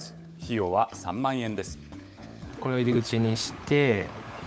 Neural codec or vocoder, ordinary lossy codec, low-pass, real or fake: codec, 16 kHz, 16 kbps, FunCodec, trained on LibriTTS, 50 frames a second; none; none; fake